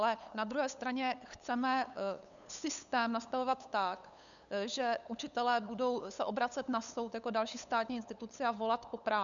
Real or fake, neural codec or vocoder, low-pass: fake; codec, 16 kHz, 8 kbps, FunCodec, trained on LibriTTS, 25 frames a second; 7.2 kHz